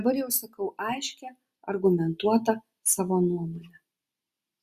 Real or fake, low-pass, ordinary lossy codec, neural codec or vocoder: real; 14.4 kHz; Opus, 64 kbps; none